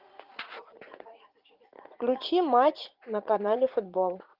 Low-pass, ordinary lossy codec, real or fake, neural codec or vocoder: 5.4 kHz; Opus, 32 kbps; fake; vocoder, 44.1 kHz, 80 mel bands, Vocos